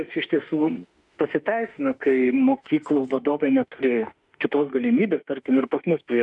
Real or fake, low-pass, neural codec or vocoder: fake; 10.8 kHz; autoencoder, 48 kHz, 32 numbers a frame, DAC-VAE, trained on Japanese speech